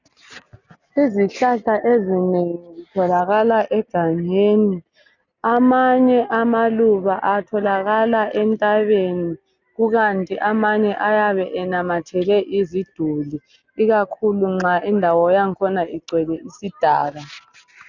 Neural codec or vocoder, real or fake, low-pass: none; real; 7.2 kHz